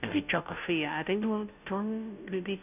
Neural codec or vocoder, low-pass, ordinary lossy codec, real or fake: codec, 16 kHz, 0.5 kbps, FunCodec, trained on Chinese and English, 25 frames a second; 3.6 kHz; none; fake